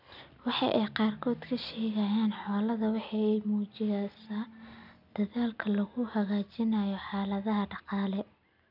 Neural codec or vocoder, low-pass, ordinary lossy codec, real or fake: none; 5.4 kHz; none; real